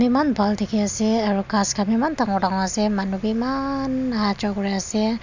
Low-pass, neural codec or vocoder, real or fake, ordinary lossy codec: 7.2 kHz; none; real; none